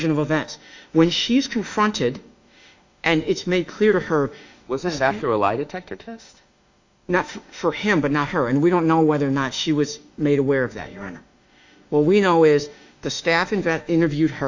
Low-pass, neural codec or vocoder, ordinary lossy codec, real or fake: 7.2 kHz; autoencoder, 48 kHz, 32 numbers a frame, DAC-VAE, trained on Japanese speech; AAC, 48 kbps; fake